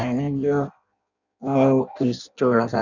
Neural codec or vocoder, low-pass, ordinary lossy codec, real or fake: codec, 16 kHz in and 24 kHz out, 0.6 kbps, FireRedTTS-2 codec; 7.2 kHz; none; fake